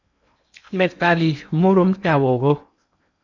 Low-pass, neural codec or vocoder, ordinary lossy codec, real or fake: 7.2 kHz; codec, 16 kHz in and 24 kHz out, 0.8 kbps, FocalCodec, streaming, 65536 codes; MP3, 64 kbps; fake